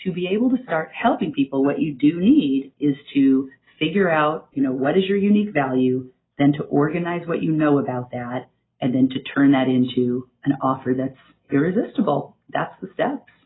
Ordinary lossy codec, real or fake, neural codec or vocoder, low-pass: AAC, 16 kbps; real; none; 7.2 kHz